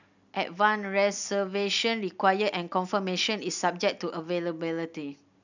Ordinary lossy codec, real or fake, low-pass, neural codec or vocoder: none; real; 7.2 kHz; none